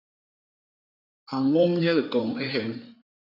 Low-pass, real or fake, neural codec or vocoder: 5.4 kHz; fake; codec, 16 kHz in and 24 kHz out, 2.2 kbps, FireRedTTS-2 codec